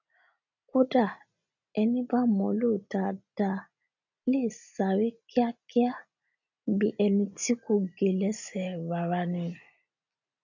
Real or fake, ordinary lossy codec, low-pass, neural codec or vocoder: real; none; 7.2 kHz; none